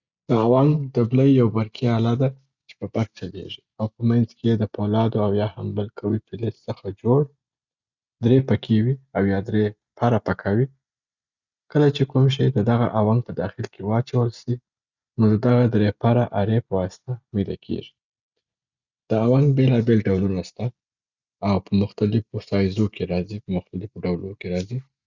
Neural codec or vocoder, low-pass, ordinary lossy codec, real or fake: none; 7.2 kHz; Opus, 64 kbps; real